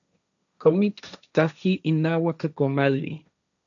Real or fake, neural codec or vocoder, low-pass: fake; codec, 16 kHz, 1.1 kbps, Voila-Tokenizer; 7.2 kHz